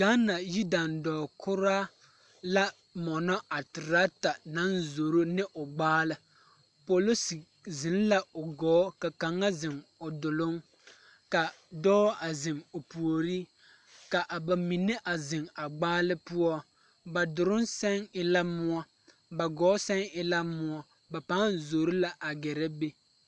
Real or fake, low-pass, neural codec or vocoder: real; 10.8 kHz; none